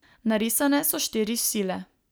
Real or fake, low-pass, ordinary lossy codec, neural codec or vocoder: real; none; none; none